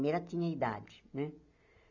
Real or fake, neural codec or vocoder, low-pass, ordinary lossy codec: real; none; 7.2 kHz; none